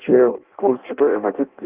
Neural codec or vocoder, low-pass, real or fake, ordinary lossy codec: codec, 16 kHz in and 24 kHz out, 0.6 kbps, FireRedTTS-2 codec; 3.6 kHz; fake; Opus, 24 kbps